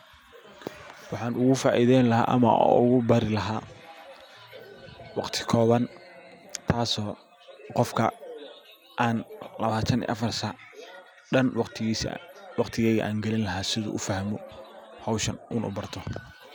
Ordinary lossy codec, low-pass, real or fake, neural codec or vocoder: none; none; real; none